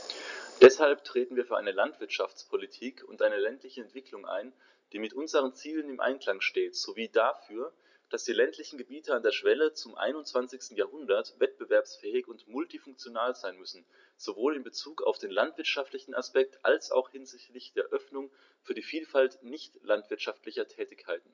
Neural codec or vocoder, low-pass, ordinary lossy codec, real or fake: none; 7.2 kHz; none; real